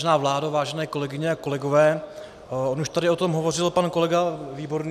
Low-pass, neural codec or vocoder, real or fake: 14.4 kHz; none; real